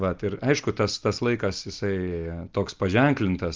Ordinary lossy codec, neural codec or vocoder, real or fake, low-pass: Opus, 32 kbps; none; real; 7.2 kHz